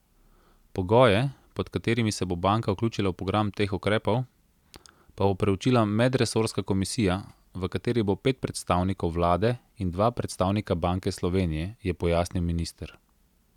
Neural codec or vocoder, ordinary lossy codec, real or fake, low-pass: none; none; real; 19.8 kHz